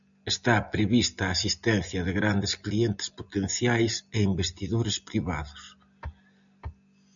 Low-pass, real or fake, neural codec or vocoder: 7.2 kHz; real; none